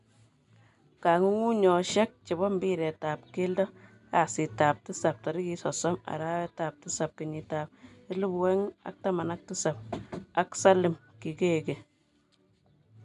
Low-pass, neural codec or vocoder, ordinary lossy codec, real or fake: 10.8 kHz; none; none; real